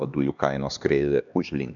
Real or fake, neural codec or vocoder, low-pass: fake; codec, 16 kHz, 2 kbps, X-Codec, HuBERT features, trained on LibriSpeech; 7.2 kHz